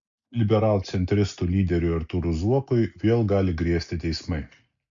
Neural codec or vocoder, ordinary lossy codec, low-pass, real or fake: none; MP3, 64 kbps; 7.2 kHz; real